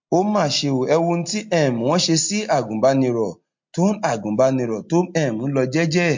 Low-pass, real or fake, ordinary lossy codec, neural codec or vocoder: 7.2 kHz; real; MP3, 48 kbps; none